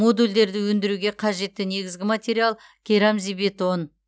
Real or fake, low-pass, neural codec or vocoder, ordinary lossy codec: real; none; none; none